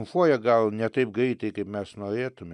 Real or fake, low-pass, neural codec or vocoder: real; 10.8 kHz; none